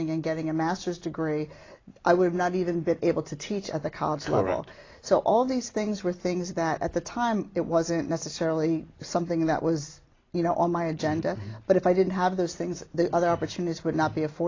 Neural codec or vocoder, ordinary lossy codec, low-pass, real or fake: none; AAC, 32 kbps; 7.2 kHz; real